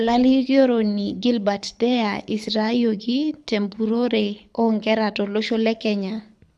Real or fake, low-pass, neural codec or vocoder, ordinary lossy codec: fake; none; codec, 24 kHz, 6 kbps, HILCodec; none